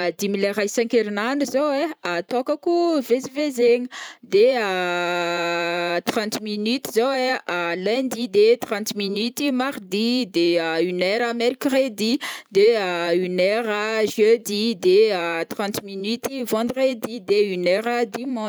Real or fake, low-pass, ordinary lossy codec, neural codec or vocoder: fake; none; none; vocoder, 44.1 kHz, 128 mel bands every 512 samples, BigVGAN v2